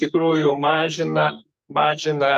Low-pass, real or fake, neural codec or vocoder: 14.4 kHz; fake; codec, 44.1 kHz, 2.6 kbps, SNAC